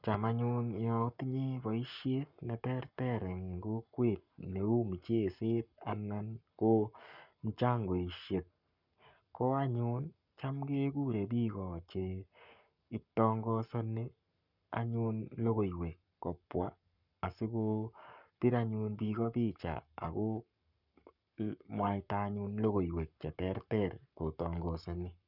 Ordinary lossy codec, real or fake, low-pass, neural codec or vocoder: none; fake; 5.4 kHz; codec, 44.1 kHz, 7.8 kbps, Pupu-Codec